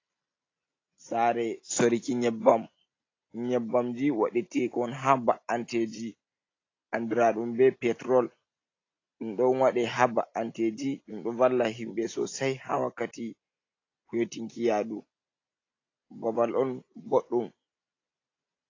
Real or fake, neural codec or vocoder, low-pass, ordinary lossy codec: real; none; 7.2 kHz; AAC, 32 kbps